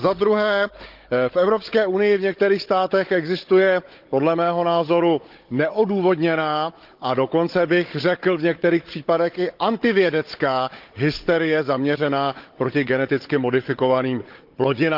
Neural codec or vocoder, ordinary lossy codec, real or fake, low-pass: codec, 16 kHz, 16 kbps, FunCodec, trained on Chinese and English, 50 frames a second; Opus, 32 kbps; fake; 5.4 kHz